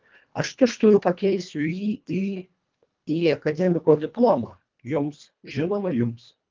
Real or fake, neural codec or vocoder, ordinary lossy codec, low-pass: fake; codec, 24 kHz, 1.5 kbps, HILCodec; Opus, 24 kbps; 7.2 kHz